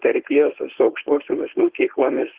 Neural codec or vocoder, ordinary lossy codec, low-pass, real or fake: codec, 16 kHz, 4.8 kbps, FACodec; Opus, 16 kbps; 3.6 kHz; fake